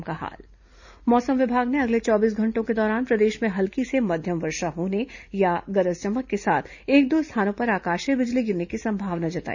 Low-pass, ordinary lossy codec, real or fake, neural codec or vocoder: 7.2 kHz; none; real; none